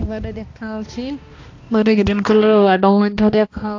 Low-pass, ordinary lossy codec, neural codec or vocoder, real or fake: 7.2 kHz; AAC, 48 kbps; codec, 16 kHz, 1 kbps, X-Codec, HuBERT features, trained on balanced general audio; fake